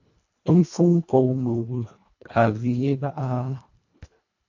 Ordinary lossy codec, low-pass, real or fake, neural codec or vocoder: AAC, 48 kbps; 7.2 kHz; fake; codec, 24 kHz, 1.5 kbps, HILCodec